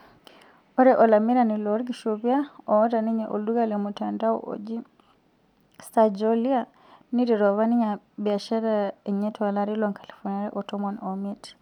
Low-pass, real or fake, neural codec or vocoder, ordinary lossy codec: 19.8 kHz; real; none; none